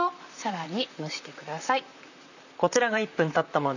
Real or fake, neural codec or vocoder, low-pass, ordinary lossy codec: fake; vocoder, 44.1 kHz, 128 mel bands, Pupu-Vocoder; 7.2 kHz; none